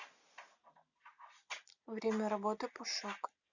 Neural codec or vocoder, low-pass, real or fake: none; 7.2 kHz; real